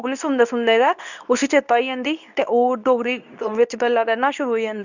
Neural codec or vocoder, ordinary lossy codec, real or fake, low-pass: codec, 24 kHz, 0.9 kbps, WavTokenizer, medium speech release version 2; none; fake; 7.2 kHz